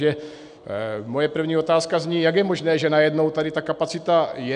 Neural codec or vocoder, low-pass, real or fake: none; 9.9 kHz; real